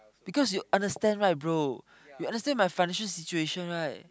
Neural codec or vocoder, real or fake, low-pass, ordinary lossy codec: none; real; none; none